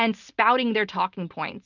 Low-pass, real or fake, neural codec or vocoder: 7.2 kHz; real; none